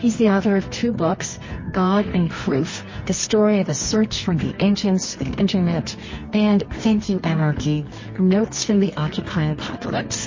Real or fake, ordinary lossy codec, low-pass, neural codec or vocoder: fake; MP3, 32 kbps; 7.2 kHz; codec, 24 kHz, 0.9 kbps, WavTokenizer, medium music audio release